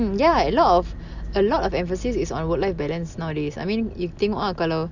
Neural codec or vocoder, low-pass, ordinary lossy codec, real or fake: none; 7.2 kHz; none; real